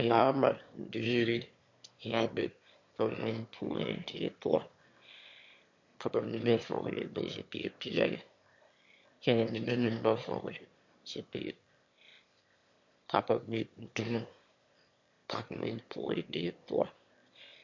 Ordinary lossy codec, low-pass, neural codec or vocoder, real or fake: MP3, 48 kbps; 7.2 kHz; autoencoder, 22.05 kHz, a latent of 192 numbers a frame, VITS, trained on one speaker; fake